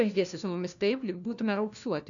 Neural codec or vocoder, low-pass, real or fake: codec, 16 kHz, 1 kbps, FunCodec, trained on LibriTTS, 50 frames a second; 7.2 kHz; fake